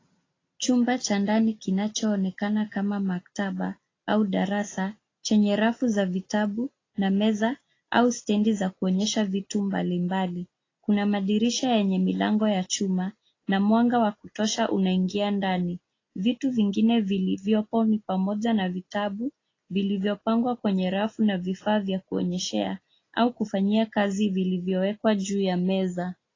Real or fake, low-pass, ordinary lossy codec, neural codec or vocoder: real; 7.2 kHz; AAC, 32 kbps; none